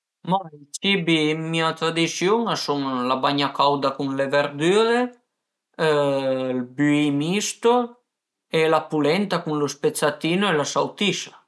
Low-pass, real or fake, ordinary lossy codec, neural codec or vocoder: none; real; none; none